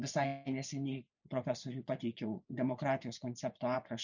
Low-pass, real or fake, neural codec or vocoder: 7.2 kHz; fake; vocoder, 24 kHz, 100 mel bands, Vocos